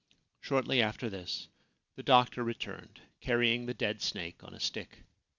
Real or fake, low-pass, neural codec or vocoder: real; 7.2 kHz; none